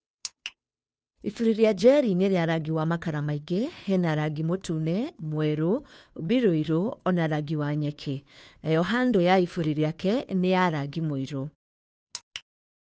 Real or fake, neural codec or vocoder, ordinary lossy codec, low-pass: fake; codec, 16 kHz, 2 kbps, FunCodec, trained on Chinese and English, 25 frames a second; none; none